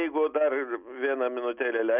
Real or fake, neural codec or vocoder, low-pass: real; none; 3.6 kHz